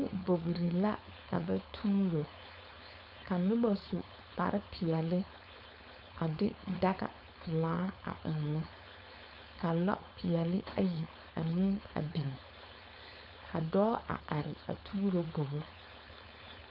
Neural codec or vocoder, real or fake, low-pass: codec, 16 kHz, 4.8 kbps, FACodec; fake; 5.4 kHz